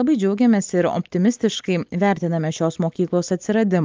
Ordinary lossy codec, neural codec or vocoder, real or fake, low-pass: Opus, 24 kbps; none; real; 7.2 kHz